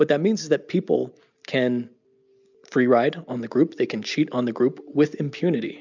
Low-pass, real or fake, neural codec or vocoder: 7.2 kHz; real; none